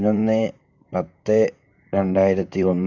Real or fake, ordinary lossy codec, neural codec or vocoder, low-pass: fake; none; vocoder, 44.1 kHz, 128 mel bands, Pupu-Vocoder; 7.2 kHz